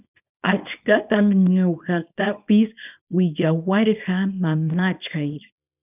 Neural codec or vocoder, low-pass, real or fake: codec, 24 kHz, 0.9 kbps, WavTokenizer, small release; 3.6 kHz; fake